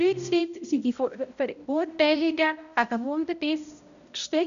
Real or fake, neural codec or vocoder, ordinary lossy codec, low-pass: fake; codec, 16 kHz, 0.5 kbps, X-Codec, HuBERT features, trained on balanced general audio; none; 7.2 kHz